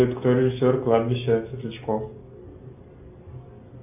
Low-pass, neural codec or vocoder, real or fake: 3.6 kHz; none; real